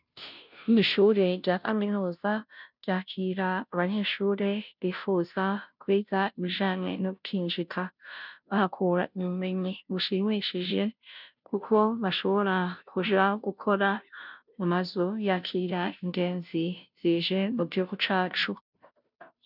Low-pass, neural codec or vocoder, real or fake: 5.4 kHz; codec, 16 kHz, 0.5 kbps, FunCodec, trained on Chinese and English, 25 frames a second; fake